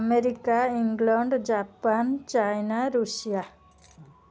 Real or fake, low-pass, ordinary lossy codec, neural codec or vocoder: real; none; none; none